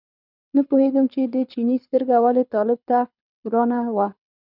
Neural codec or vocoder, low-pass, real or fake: codec, 24 kHz, 6 kbps, HILCodec; 5.4 kHz; fake